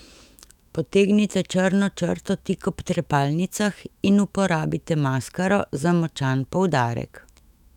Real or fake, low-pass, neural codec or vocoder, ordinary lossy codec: fake; 19.8 kHz; autoencoder, 48 kHz, 128 numbers a frame, DAC-VAE, trained on Japanese speech; none